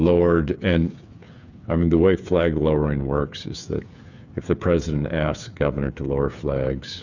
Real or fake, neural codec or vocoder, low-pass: fake; codec, 16 kHz, 8 kbps, FreqCodec, smaller model; 7.2 kHz